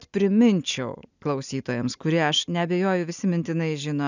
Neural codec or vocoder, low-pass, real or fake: none; 7.2 kHz; real